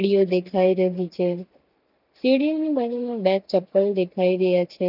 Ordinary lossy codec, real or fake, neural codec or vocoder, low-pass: none; real; none; 5.4 kHz